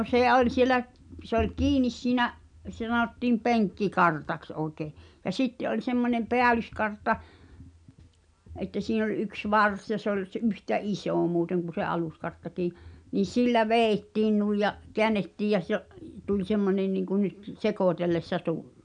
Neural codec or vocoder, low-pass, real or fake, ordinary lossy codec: none; 9.9 kHz; real; none